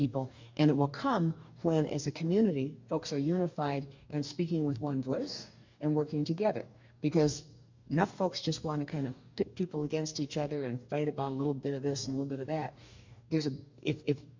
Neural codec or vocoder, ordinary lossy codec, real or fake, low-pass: codec, 44.1 kHz, 2.6 kbps, DAC; MP3, 64 kbps; fake; 7.2 kHz